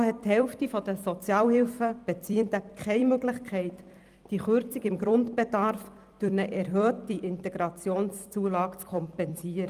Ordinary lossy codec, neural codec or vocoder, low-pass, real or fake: Opus, 32 kbps; vocoder, 44.1 kHz, 128 mel bands every 256 samples, BigVGAN v2; 14.4 kHz; fake